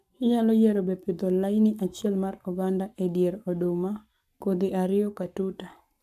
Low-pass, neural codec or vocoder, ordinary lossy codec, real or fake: 14.4 kHz; codec, 44.1 kHz, 7.8 kbps, Pupu-Codec; none; fake